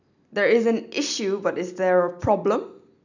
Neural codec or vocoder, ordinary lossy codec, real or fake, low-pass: none; none; real; 7.2 kHz